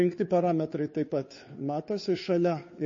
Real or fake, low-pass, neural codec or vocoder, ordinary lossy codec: fake; 7.2 kHz; codec, 16 kHz, 2 kbps, FunCodec, trained on Chinese and English, 25 frames a second; MP3, 32 kbps